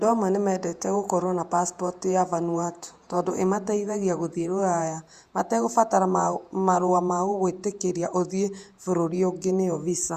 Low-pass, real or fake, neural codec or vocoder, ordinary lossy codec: 14.4 kHz; fake; vocoder, 48 kHz, 128 mel bands, Vocos; Opus, 64 kbps